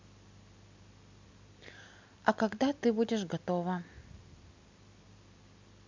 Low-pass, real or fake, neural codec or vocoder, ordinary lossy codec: 7.2 kHz; real; none; MP3, 64 kbps